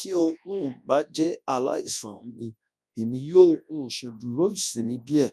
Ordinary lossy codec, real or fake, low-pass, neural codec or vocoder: none; fake; none; codec, 24 kHz, 0.9 kbps, WavTokenizer, large speech release